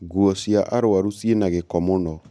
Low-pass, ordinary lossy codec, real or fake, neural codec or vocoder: none; none; real; none